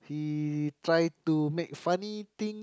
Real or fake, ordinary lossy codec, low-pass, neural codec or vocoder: real; none; none; none